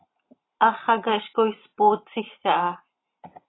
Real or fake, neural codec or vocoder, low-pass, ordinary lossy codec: real; none; 7.2 kHz; AAC, 16 kbps